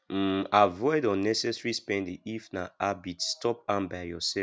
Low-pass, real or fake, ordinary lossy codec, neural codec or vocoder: none; real; none; none